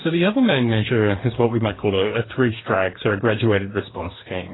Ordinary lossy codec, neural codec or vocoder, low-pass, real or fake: AAC, 16 kbps; codec, 44.1 kHz, 2.6 kbps, DAC; 7.2 kHz; fake